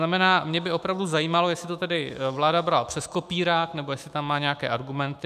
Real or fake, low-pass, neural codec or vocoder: fake; 14.4 kHz; autoencoder, 48 kHz, 128 numbers a frame, DAC-VAE, trained on Japanese speech